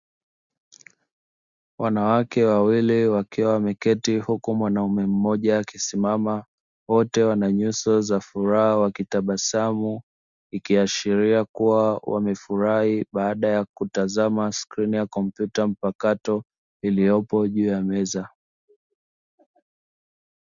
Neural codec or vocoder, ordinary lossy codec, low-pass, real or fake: none; Opus, 64 kbps; 7.2 kHz; real